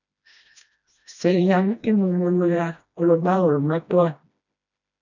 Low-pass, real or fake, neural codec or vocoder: 7.2 kHz; fake; codec, 16 kHz, 1 kbps, FreqCodec, smaller model